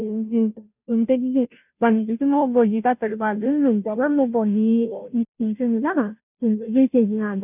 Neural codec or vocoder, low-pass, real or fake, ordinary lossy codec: codec, 16 kHz, 0.5 kbps, FunCodec, trained on Chinese and English, 25 frames a second; 3.6 kHz; fake; none